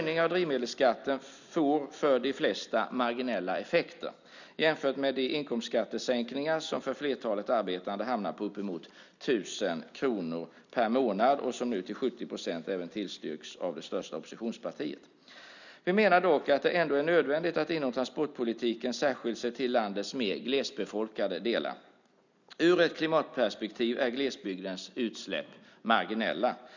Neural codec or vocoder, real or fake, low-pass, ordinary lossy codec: none; real; 7.2 kHz; none